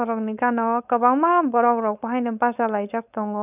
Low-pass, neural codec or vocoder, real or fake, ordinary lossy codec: 3.6 kHz; codec, 16 kHz, 4.8 kbps, FACodec; fake; none